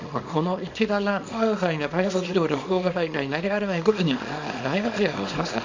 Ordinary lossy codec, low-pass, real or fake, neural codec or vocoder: MP3, 48 kbps; 7.2 kHz; fake; codec, 24 kHz, 0.9 kbps, WavTokenizer, small release